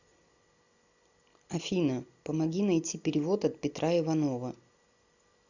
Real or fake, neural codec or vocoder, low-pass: real; none; 7.2 kHz